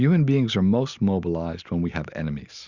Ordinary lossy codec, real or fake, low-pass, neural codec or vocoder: Opus, 64 kbps; real; 7.2 kHz; none